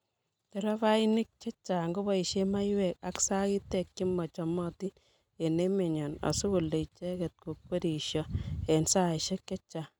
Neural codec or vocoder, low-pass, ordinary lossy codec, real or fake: none; 19.8 kHz; none; real